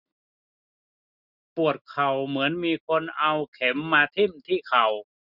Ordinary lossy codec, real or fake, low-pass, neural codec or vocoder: none; real; 5.4 kHz; none